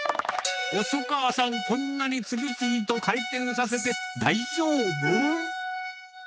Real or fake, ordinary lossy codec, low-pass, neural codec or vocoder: fake; none; none; codec, 16 kHz, 2 kbps, X-Codec, HuBERT features, trained on general audio